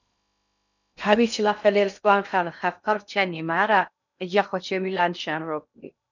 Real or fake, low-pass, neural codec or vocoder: fake; 7.2 kHz; codec, 16 kHz in and 24 kHz out, 0.6 kbps, FocalCodec, streaming, 2048 codes